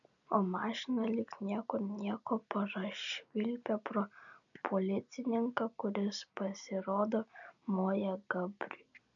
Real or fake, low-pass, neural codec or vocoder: real; 7.2 kHz; none